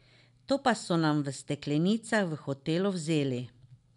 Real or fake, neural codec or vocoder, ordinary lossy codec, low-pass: real; none; none; 10.8 kHz